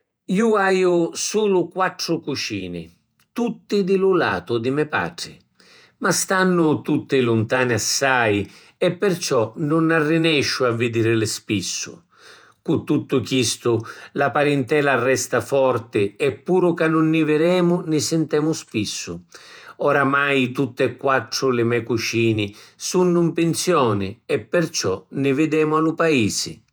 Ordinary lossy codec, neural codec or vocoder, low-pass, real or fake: none; vocoder, 48 kHz, 128 mel bands, Vocos; none; fake